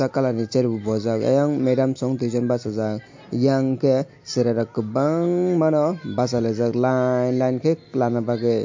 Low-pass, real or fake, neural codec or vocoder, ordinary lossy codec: 7.2 kHz; real; none; MP3, 48 kbps